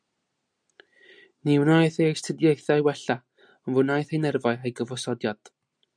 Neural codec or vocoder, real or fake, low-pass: none; real; 9.9 kHz